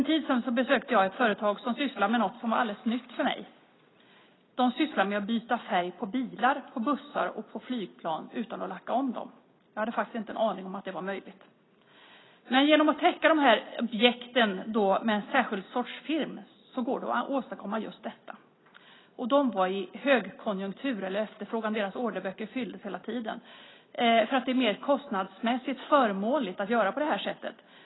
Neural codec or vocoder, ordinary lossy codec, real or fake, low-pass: none; AAC, 16 kbps; real; 7.2 kHz